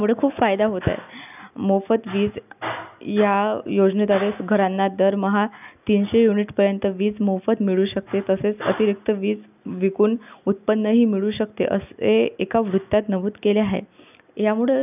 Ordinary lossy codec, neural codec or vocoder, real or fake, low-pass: none; none; real; 3.6 kHz